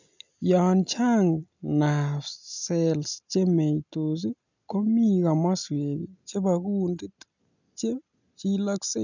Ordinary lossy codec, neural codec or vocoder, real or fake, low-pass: none; none; real; 7.2 kHz